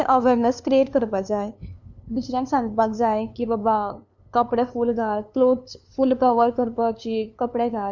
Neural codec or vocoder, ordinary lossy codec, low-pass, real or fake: codec, 16 kHz, 2 kbps, FunCodec, trained on LibriTTS, 25 frames a second; none; 7.2 kHz; fake